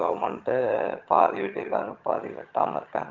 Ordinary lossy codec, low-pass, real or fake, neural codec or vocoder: Opus, 32 kbps; 7.2 kHz; fake; vocoder, 22.05 kHz, 80 mel bands, HiFi-GAN